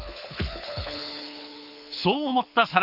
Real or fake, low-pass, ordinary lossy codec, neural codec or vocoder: fake; 5.4 kHz; none; codec, 24 kHz, 6 kbps, HILCodec